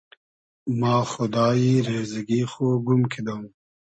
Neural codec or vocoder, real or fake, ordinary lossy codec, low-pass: none; real; MP3, 32 kbps; 9.9 kHz